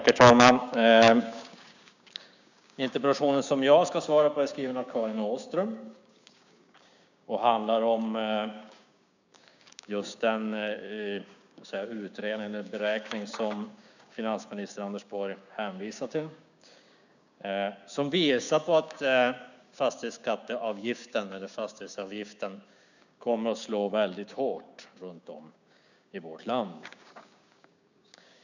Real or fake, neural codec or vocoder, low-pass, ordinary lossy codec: fake; codec, 16 kHz, 6 kbps, DAC; 7.2 kHz; none